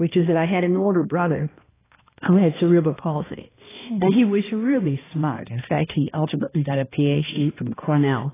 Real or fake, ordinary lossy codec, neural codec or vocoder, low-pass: fake; AAC, 16 kbps; codec, 16 kHz, 1 kbps, X-Codec, HuBERT features, trained on balanced general audio; 3.6 kHz